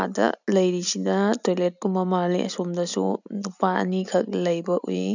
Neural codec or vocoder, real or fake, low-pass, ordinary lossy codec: none; real; 7.2 kHz; none